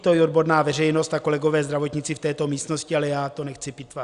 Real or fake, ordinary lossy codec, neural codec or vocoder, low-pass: real; Opus, 64 kbps; none; 10.8 kHz